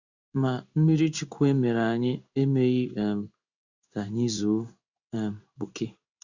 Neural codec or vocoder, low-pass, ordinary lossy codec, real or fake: codec, 16 kHz in and 24 kHz out, 1 kbps, XY-Tokenizer; 7.2 kHz; Opus, 64 kbps; fake